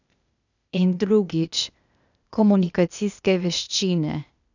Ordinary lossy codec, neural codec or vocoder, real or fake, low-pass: none; codec, 16 kHz, 0.8 kbps, ZipCodec; fake; 7.2 kHz